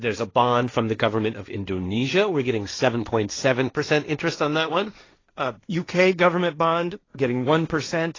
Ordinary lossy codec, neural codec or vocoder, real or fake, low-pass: AAC, 32 kbps; codec, 16 kHz, 1.1 kbps, Voila-Tokenizer; fake; 7.2 kHz